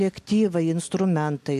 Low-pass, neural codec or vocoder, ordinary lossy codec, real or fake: 14.4 kHz; none; MP3, 64 kbps; real